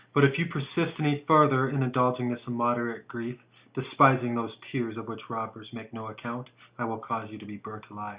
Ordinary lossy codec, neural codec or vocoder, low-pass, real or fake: Opus, 64 kbps; none; 3.6 kHz; real